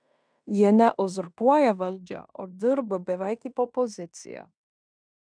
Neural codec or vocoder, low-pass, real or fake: codec, 16 kHz in and 24 kHz out, 0.9 kbps, LongCat-Audio-Codec, fine tuned four codebook decoder; 9.9 kHz; fake